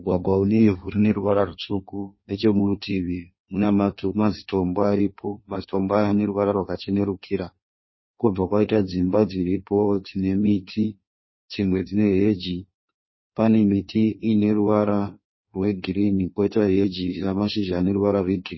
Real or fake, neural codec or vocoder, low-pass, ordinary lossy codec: fake; codec, 16 kHz in and 24 kHz out, 1.1 kbps, FireRedTTS-2 codec; 7.2 kHz; MP3, 24 kbps